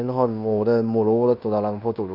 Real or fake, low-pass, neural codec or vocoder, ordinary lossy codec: fake; 5.4 kHz; codec, 16 kHz in and 24 kHz out, 0.9 kbps, LongCat-Audio-Codec, fine tuned four codebook decoder; Opus, 64 kbps